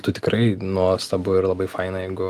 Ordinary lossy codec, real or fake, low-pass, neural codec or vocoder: Opus, 32 kbps; real; 14.4 kHz; none